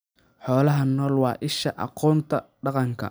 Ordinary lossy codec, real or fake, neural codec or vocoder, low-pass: none; real; none; none